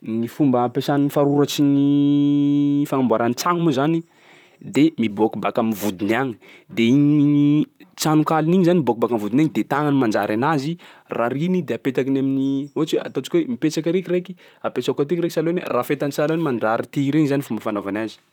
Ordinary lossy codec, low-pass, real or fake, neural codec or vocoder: none; 19.8 kHz; fake; vocoder, 44.1 kHz, 128 mel bands, Pupu-Vocoder